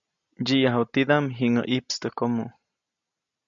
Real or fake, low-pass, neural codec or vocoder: real; 7.2 kHz; none